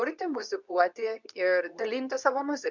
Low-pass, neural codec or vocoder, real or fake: 7.2 kHz; codec, 24 kHz, 0.9 kbps, WavTokenizer, medium speech release version 2; fake